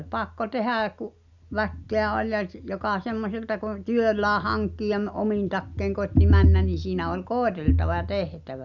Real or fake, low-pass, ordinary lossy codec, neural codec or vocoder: real; 7.2 kHz; none; none